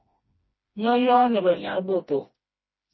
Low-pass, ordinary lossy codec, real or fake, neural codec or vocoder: 7.2 kHz; MP3, 24 kbps; fake; codec, 16 kHz, 1 kbps, FreqCodec, smaller model